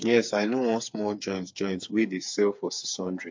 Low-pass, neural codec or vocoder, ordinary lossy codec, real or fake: 7.2 kHz; codec, 16 kHz, 4 kbps, FreqCodec, smaller model; MP3, 48 kbps; fake